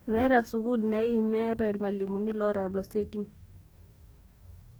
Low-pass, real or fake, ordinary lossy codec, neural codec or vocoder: none; fake; none; codec, 44.1 kHz, 2.6 kbps, DAC